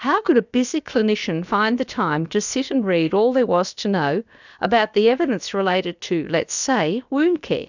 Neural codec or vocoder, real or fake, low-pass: codec, 16 kHz, about 1 kbps, DyCAST, with the encoder's durations; fake; 7.2 kHz